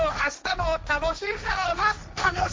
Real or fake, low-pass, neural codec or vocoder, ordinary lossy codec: fake; none; codec, 16 kHz, 1.1 kbps, Voila-Tokenizer; none